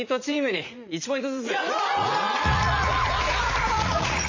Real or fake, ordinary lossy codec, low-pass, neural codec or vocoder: fake; MP3, 64 kbps; 7.2 kHz; vocoder, 44.1 kHz, 80 mel bands, Vocos